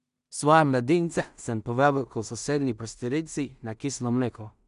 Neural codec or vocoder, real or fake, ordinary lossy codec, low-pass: codec, 16 kHz in and 24 kHz out, 0.4 kbps, LongCat-Audio-Codec, two codebook decoder; fake; none; 10.8 kHz